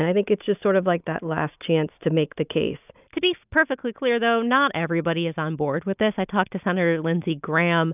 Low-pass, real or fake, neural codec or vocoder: 3.6 kHz; real; none